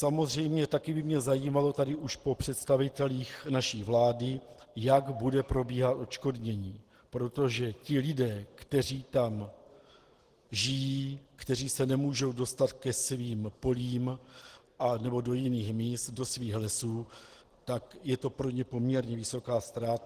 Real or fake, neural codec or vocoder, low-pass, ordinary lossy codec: real; none; 14.4 kHz; Opus, 16 kbps